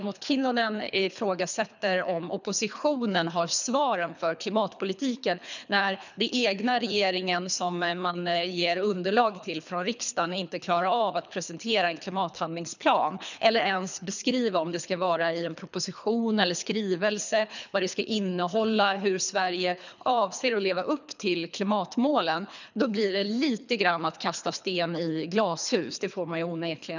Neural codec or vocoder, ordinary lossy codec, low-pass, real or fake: codec, 24 kHz, 3 kbps, HILCodec; none; 7.2 kHz; fake